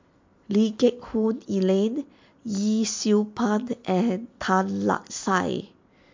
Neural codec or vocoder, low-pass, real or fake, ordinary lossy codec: none; 7.2 kHz; real; MP3, 48 kbps